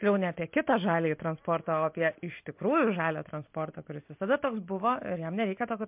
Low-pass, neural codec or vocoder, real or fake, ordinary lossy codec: 3.6 kHz; none; real; MP3, 32 kbps